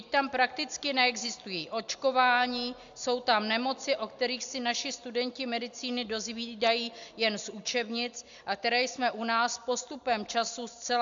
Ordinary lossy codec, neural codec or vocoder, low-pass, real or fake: AAC, 64 kbps; none; 7.2 kHz; real